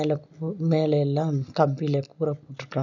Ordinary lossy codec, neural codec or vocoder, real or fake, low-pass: none; none; real; 7.2 kHz